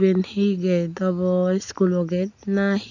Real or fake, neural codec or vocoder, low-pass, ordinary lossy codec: real; none; 7.2 kHz; none